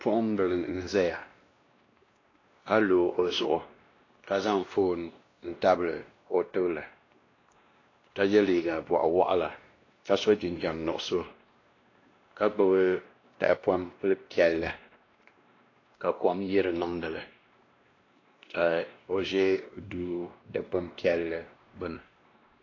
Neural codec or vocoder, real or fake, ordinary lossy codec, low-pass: codec, 16 kHz, 1 kbps, X-Codec, WavLM features, trained on Multilingual LibriSpeech; fake; AAC, 32 kbps; 7.2 kHz